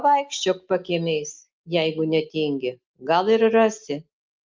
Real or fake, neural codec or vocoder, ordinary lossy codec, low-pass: real; none; Opus, 24 kbps; 7.2 kHz